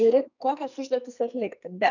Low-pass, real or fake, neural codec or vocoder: 7.2 kHz; fake; codec, 44.1 kHz, 2.6 kbps, SNAC